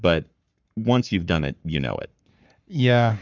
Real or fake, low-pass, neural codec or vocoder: fake; 7.2 kHz; codec, 44.1 kHz, 7.8 kbps, Pupu-Codec